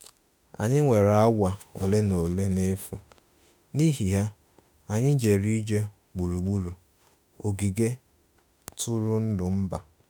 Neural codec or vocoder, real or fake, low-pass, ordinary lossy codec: autoencoder, 48 kHz, 32 numbers a frame, DAC-VAE, trained on Japanese speech; fake; none; none